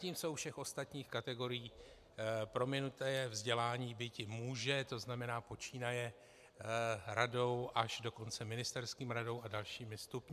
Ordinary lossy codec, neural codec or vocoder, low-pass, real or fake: MP3, 96 kbps; none; 14.4 kHz; real